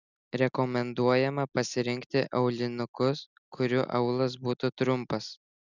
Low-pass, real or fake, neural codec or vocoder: 7.2 kHz; real; none